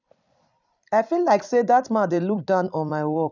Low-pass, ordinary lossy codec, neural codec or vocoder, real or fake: 7.2 kHz; none; vocoder, 44.1 kHz, 128 mel bands every 256 samples, BigVGAN v2; fake